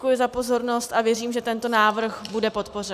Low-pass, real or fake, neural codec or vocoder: 14.4 kHz; real; none